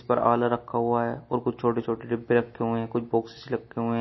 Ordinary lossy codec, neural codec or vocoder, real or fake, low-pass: MP3, 24 kbps; none; real; 7.2 kHz